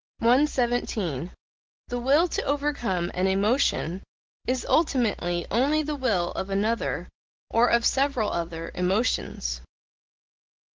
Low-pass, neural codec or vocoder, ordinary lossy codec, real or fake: 7.2 kHz; none; Opus, 16 kbps; real